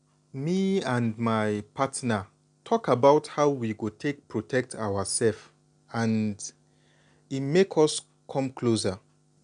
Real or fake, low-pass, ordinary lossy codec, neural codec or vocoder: real; 9.9 kHz; none; none